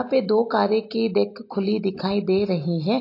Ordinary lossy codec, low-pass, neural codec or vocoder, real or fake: AAC, 32 kbps; 5.4 kHz; none; real